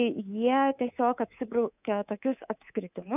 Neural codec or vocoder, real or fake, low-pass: codec, 24 kHz, 3.1 kbps, DualCodec; fake; 3.6 kHz